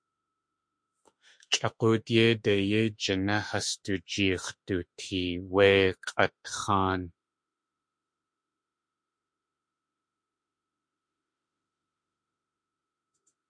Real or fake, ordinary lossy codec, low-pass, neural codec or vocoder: fake; MP3, 48 kbps; 9.9 kHz; autoencoder, 48 kHz, 32 numbers a frame, DAC-VAE, trained on Japanese speech